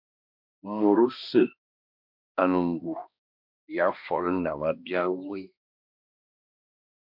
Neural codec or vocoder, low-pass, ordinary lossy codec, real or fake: codec, 16 kHz, 1 kbps, X-Codec, HuBERT features, trained on balanced general audio; 5.4 kHz; MP3, 48 kbps; fake